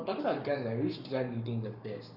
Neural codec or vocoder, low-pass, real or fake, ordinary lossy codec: codec, 44.1 kHz, 7.8 kbps, Pupu-Codec; 5.4 kHz; fake; none